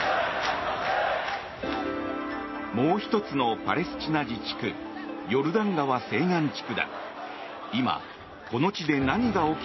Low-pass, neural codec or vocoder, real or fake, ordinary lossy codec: 7.2 kHz; none; real; MP3, 24 kbps